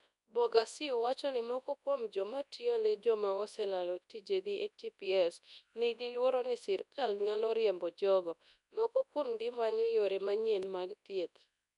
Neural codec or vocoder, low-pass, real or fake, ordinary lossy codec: codec, 24 kHz, 0.9 kbps, WavTokenizer, large speech release; 10.8 kHz; fake; none